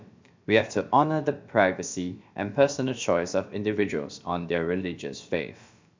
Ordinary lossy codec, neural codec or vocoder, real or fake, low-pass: MP3, 64 kbps; codec, 16 kHz, about 1 kbps, DyCAST, with the encoder's durations; fake; 7.2 kHz